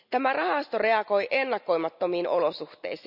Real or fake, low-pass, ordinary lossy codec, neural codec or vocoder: real; 5.4 kHz; none; none